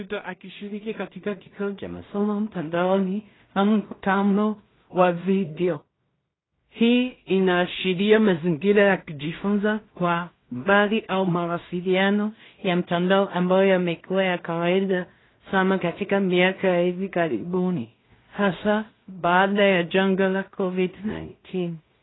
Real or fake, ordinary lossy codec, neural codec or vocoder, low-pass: fake; AAC, 16 kbps; codec, 16 kHz in and 24 kHz out, 0.4 kbps, LongCat-Audio-Codec, two codebook decoder; 7.2 kHz